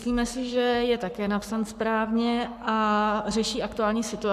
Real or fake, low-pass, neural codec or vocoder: fake; 14.4 kHz; codec, 44.1 kHz, 7.8 kbps, Pupu-Codec